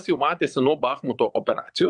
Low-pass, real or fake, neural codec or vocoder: 9.9 kHz; fake; vocoder, 22.05 kHz, 80 mel bands, Vocos